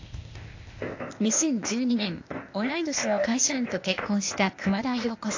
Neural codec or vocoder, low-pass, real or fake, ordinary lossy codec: codec, 16 kHz, 0.8 kbps, ZipCodec; 7.2 kHz; fake; AAC, 48 kbps